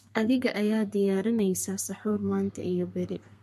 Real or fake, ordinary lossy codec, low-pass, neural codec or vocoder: fake; MP3, 64 kbps; 14.4 kHz; codec, 32 kHz, 1.9 kbps, SNAC